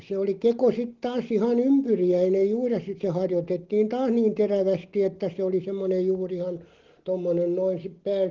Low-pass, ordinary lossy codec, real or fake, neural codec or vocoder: 7.2 kHz; Opus, 16 kbps; real; none